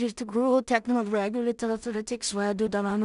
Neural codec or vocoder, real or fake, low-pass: codec, 16 kHz in and 24 kHz out, 0.4 kbps, LongCat-Audio-Codec, two codebook decoder; fake; 10.8 kHz